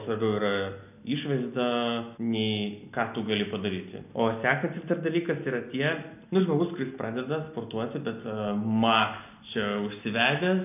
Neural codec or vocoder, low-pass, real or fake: none; 3.6 kHz; real